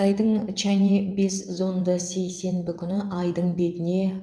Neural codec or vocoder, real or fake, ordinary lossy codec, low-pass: vocoder, 22.05 kHz, 80 mel bands, WaveNeXt; fake; none; none